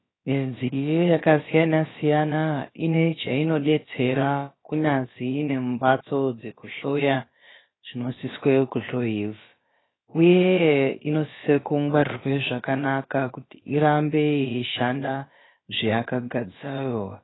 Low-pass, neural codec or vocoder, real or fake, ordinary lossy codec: 7.2 kHz; codec, 16 kHz, about 1 kbps, DyCAST, with the encoder's durations; fake; AAC, 16 kbps